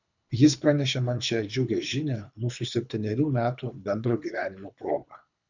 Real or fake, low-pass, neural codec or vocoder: fake; 7.2 kHz; codec, 24 kHz, 3 kbps, HILCodec